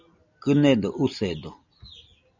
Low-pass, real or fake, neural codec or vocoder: 7.2 kHz; real; none